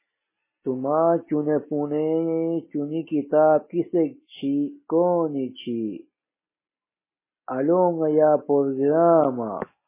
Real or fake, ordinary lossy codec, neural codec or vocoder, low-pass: real; MP3, 16 kbps; none; 3.6 kHz